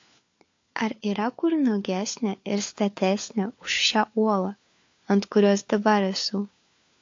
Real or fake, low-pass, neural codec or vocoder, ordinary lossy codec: fake; 7.2 kHz; codec, 16 kHz, 4 kbps, FunCodec, trained on LibriTTS, 50 frames a second; AAC, 48 kbps